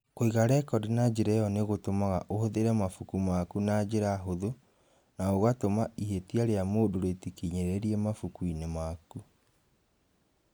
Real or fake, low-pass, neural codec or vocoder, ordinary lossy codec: real; none; none; none